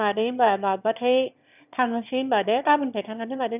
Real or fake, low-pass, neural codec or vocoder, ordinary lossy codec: fake; 3.6 kHz; autoencoder, 22.05 kHz, a latent of 192 numbers a frame, VITS, trained on one speaker; none